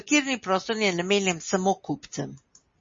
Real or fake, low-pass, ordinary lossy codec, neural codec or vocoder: real; 7.2 kHz; MP3, 32 kbps; none